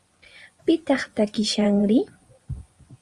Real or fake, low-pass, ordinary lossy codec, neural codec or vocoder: fake; 10.8 kHz; Opus, 32 kbps; vocoder, 24 kHz, 100 mel bands, Vocos